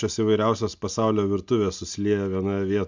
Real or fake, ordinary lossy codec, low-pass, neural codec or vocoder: real; MP3, 64 kbps; 7.2 kHz; none